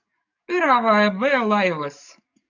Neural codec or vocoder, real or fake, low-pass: codec, 44.1 kHz, 7.8 kbps, DAC; fake; 7.2 kHz